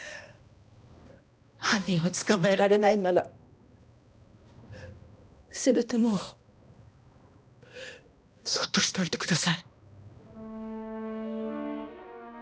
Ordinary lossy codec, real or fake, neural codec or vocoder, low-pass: none; fake; codec, 16 kHz, 1 kbps, X-Codec, HuBERT features, trained on balanced general audio; none